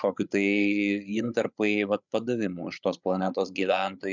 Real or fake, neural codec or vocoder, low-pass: fake; codec, 16 kHz, 8 kbps, FreqCodec, larger model; 7.2 kHz